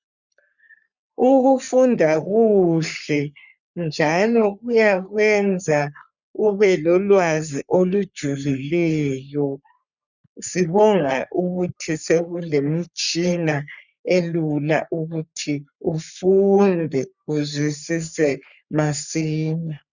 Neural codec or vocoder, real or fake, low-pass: codec, 44.1 kHz, 3.4 kbps, Pupu-Codec; fake; 7.2 kHz